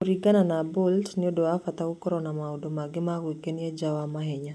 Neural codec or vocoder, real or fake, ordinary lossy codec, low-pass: none; real; none; none